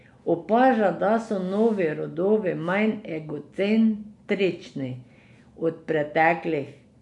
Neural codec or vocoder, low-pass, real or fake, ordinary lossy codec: none; 10.8 kHz; real; none